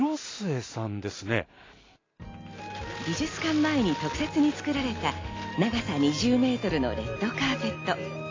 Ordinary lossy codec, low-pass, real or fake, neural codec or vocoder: AAC, 32 kbps; 7.2 kHz; real; none